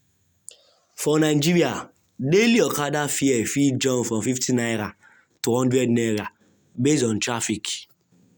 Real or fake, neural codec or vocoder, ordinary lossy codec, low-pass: real; none; none; none